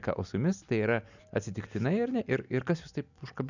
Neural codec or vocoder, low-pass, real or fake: none; 7.2 kHz; real